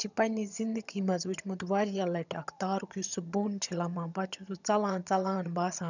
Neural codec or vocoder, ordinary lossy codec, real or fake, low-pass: vocoder, 22.05 kHz, 80 mel bands, HiFi-GAN; none; fake; 7.2 kHz